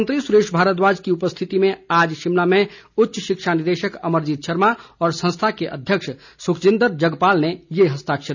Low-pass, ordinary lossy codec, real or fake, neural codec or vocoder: 7.2 kHz; none; real; none